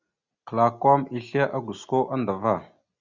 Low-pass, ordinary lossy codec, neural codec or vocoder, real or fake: 7.2 kHz; Opus, 64 kbps; none; real